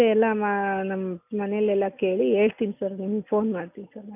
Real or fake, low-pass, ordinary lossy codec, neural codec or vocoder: real; 3.6 kHz; none; none